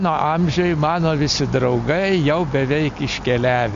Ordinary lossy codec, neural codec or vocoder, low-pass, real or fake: MP3, 48 kbps; none; 7.2 kHz; real